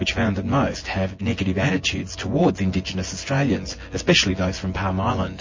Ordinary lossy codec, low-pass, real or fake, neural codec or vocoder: MP3, 32 kbps; 7.2 kHz; fake; vocoder, 24 kHz, 100 mel bands, Vocos